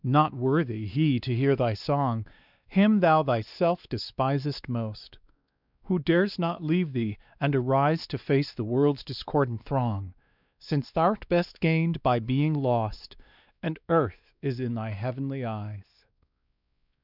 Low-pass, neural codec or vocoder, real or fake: 5.4 kHz; codec, 16 kHz, 2 kbps, X-Codec, WavLM features, trained on Multilingual LibriSpeech; fake